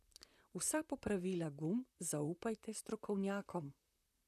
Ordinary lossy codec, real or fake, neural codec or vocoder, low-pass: none; fake; vocoder, 44.1 kHz, 128 mel bands, Pupu-Vocoder; 14.4 kHz